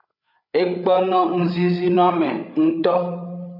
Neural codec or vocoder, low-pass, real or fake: codec, 16 kHz, 8 kbps, FreqCodec, larger model; 5.4 kHz; fake